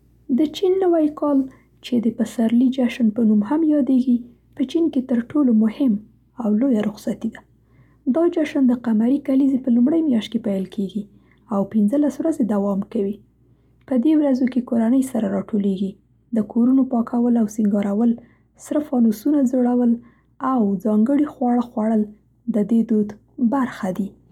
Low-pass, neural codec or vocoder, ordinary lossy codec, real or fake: 19.8 kHz; none; none; real